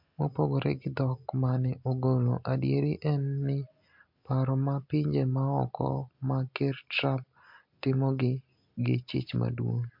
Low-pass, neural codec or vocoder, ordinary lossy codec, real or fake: 5.4 kHz; none; MP3, 48 kbps; real